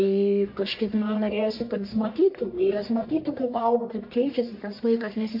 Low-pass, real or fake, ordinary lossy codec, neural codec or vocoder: 5.4 kHz; fake; MP3, 32 kbps; codec, 44.1 kHz, 1.7 kbps, Pupu-Codec